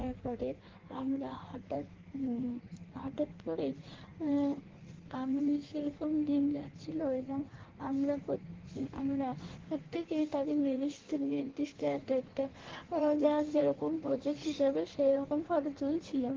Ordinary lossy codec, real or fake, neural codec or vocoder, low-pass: Opus, 16 kbps; fake; codec, 16 kHz in and 24 kHz out, 1.1 kbps, FireRedTTS-2 codec; 7.2 kHz